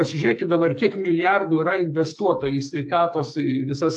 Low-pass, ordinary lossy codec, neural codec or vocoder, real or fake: 10.8 kHz; AAC, 64 kbps; codec, 44.1 kHz, 2.6 kbps, SNAC; fake